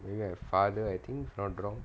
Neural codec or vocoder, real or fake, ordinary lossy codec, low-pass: none; real; none; none